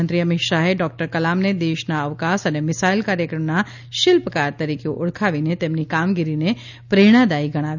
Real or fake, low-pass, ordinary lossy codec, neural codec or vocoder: real; 7.2 kHz; none; none